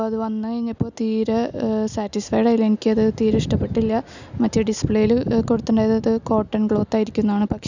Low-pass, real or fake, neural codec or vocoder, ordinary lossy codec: 7.2 kHz; real; none; none